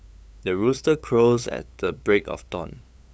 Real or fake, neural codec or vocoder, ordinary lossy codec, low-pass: fake; codec, 16 kHz, 8 kbps, FunCodec, trained on LibriTTS, 25 frames a second; none; none